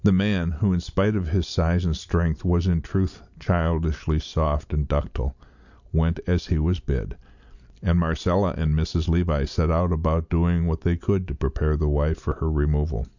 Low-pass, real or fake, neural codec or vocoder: 7.2 kHz; real; none